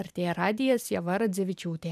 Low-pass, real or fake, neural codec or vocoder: 14.4 kHz; fake; vocoder, 44.1 kHz, 128 mel bands every 512 samples, BigVGAN v2